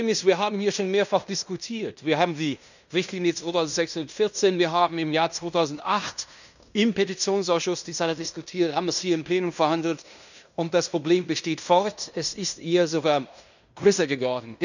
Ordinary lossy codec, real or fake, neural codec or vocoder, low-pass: none; fake; codec, 16 kHz in and 24 kHz out, 0.9 kbps, LongCat-Audio-Codec, fine tuned four codebook decoder; 7.2 kHz